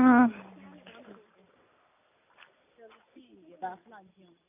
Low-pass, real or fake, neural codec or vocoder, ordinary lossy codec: 3.6 kHz; real; none; none